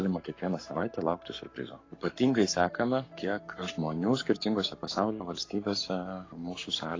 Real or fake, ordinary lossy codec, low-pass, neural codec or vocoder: fake; AAC, 32 kbps; 7.2 kHz; codec, 44.1 kHz, 7.8 kbps, Pupu-Codec